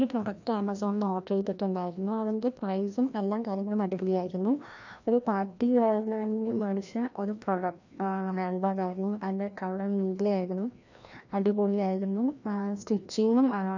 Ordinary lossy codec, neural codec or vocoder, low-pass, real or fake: none; codec, 16 kHz, 1 kbps, FreqCodec, larger model; 7.2 kHz; fake